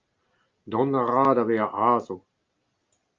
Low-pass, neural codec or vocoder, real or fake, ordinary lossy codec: 7.2 kHz; none; real; Opus, 24 kbps